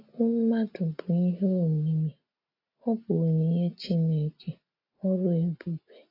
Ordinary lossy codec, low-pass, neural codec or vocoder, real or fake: AAC, 32 kbps; 5.4 kHz; none; real